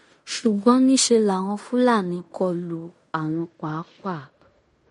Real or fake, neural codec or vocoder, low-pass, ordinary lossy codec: fake; codec, 16 kHz in and 24 kHz out, 0.9 kbps, LongCat-Audio-Codec, fine tuned four codebook decoder; 10.8 kHz; MP3, 48 kbps